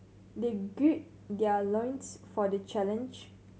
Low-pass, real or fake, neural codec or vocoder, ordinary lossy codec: none; real; none; none